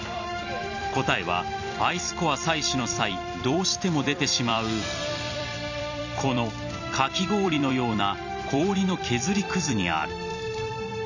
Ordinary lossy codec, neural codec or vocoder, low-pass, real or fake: none; vocoder, 44.1 kHz, 128 mel bands every 256 samples, BigVGAN v2; 7.2 kHz; fake